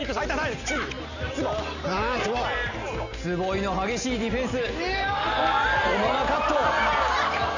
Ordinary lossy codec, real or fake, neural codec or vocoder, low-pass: none; real; none; 7.2 kHz